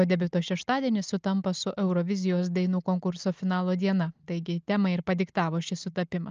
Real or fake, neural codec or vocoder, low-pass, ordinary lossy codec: real; none; 7.2 kHz; Opus, 24 kbps